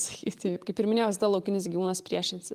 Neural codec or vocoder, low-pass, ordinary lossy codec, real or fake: vocoder, 44.1 kHz, 128 mel bands every 512 samples, BigVGAN v2; 14.4 kHz; Opus, 32 kbps; fake